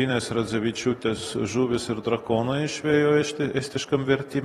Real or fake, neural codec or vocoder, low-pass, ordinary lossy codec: fake; vocoder, 44.1 kHz, 128 mel bands every 256 samples, BigVGAN v2; 19.8 kHz; AAC, 32 kbps